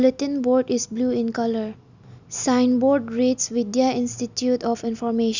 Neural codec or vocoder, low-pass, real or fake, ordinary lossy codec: none; 7.2 kHz; real; none